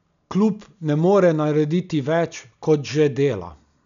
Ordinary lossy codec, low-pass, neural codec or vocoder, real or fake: none; 7.2 kHz; none; real